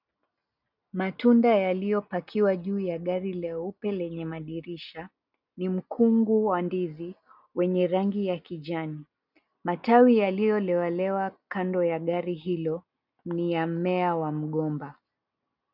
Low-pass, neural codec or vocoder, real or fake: 5.4 kHz; none; real